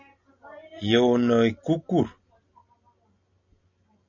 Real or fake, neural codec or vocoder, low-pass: real; none; 7.2 kHz